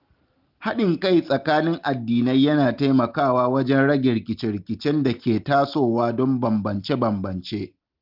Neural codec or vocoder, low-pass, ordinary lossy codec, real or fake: none; 5.4 kHz; Opus, 32 kbps; real